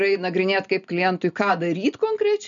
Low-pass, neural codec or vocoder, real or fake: 7.2 kHz; none; real